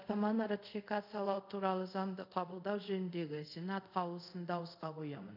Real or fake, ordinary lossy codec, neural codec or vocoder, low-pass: fake; none; codec, 24 kHz, 0.5 kbps, DualCodec; 5.4 kHz